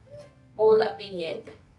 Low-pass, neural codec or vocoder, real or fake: 10.8 kHz; codec, 24 kHz, 0.9 kbps, WavTokenizer, medium music audio release; fake